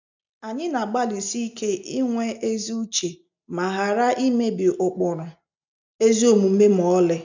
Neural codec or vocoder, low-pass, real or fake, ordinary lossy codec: none; 7.2 kHz; real; none